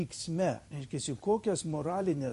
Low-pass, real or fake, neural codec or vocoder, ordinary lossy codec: 14.4 kHz; fake; vocoder, 44.1 kHz, 128 mel bands every 256 samples, BigVGAN v2; MP3, 48 kbps